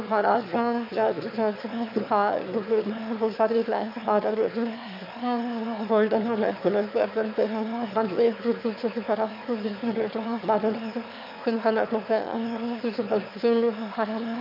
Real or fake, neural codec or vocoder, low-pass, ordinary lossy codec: fake; autoencoder, 22.05 kHz, a latent of 192 numbers a frame, VITS, trained on one speaker; 5.4 kHz; MP3, 32 kbps